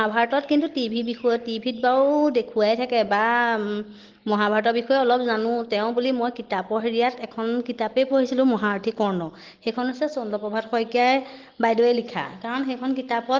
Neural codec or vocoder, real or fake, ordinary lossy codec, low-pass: none; real; Opus, 16 kbps; 7.2 kHz